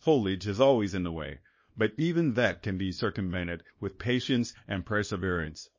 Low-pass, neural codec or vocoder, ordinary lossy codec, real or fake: 7.2 kHz; codec, 24 kHz, 0.9 kbps, WavTokenizer, small release; MP3, 32 kbps; fake